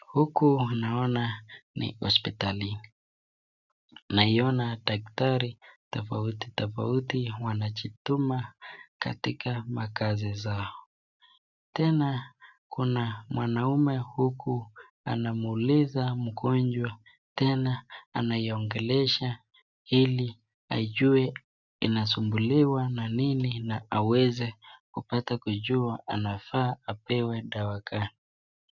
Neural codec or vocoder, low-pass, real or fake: none; 7.2 kHz; real